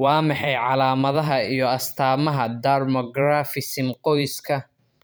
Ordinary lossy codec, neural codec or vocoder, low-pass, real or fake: none; vocoder, 44.1 kHz, 128 mel bands every 512 samples, BigVGAN v2; none; fake